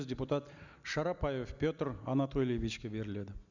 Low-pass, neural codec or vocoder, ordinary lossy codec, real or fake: 7.2 kHz; none; none; real